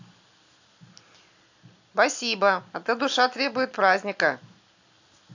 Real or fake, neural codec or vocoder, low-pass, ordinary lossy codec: real; none; 7.2 kHz; AAC, 48 kbps